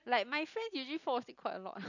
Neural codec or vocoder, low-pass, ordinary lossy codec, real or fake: none; 7.2 kHz; none; real